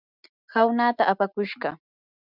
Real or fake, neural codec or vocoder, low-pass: real; none; 5.4 kHz